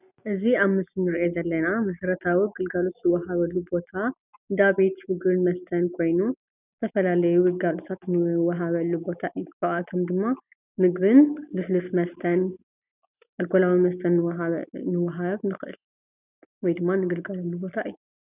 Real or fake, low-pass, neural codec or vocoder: real; 3.6 kHz; none